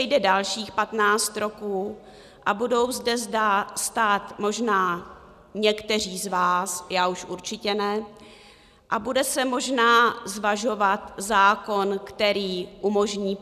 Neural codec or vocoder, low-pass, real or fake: none; 14.4 kHz; real